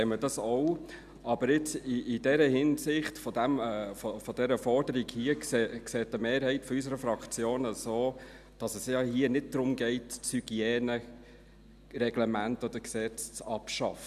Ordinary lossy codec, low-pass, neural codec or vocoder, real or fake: none; 14.4 kHz; none; real